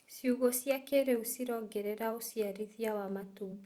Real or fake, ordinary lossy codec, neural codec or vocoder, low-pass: fake; Opus, 64 kbps; vocoder, 44.1 kHz, 128 mel bands every 512 samples, BigVGAN v2; 19.8 kHz